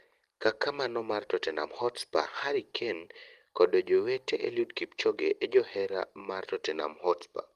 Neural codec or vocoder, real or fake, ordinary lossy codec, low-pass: none; real; Opus, 24 kbps; 14.4 kHz